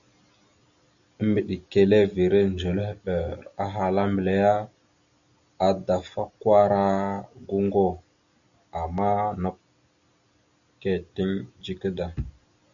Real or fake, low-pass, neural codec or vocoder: real; 7.2 kHz; none